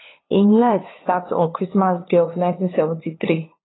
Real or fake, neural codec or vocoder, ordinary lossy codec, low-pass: fake; codec, 16 kHz, 2 kbps, FunCodec, trained on LibriTTS, 25 frames a second; AAC, 16 kbps; 7.2 kHz